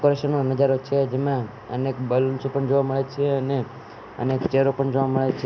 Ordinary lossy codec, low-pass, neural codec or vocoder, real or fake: none; none; none; real